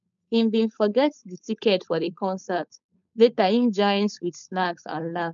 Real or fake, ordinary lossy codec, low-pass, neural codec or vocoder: fake; none; 7.2 kHz; codec, 16 kHz, 4.8 kbps, FACodec